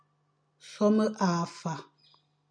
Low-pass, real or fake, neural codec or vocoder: 9.9 kHz; real; none